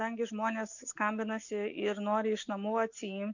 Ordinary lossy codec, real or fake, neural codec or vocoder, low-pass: MP3, 48 kbps; real; none; 7.2 kHz